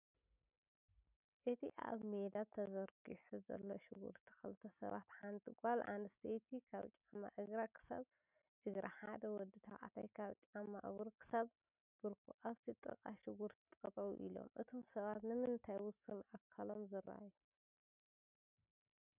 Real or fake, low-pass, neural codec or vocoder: real; 3.6 kHz; none